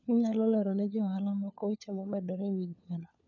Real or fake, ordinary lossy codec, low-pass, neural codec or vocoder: fake; none; 7.2 kHz; codec, 16 kHz, 4 kbps, FunCodec, trained on LibriTTS, 50 frames a second